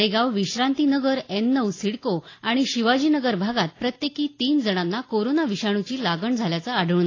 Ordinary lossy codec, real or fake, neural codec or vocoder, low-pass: AAC, 32 kbps; real; none; 7.2 kHz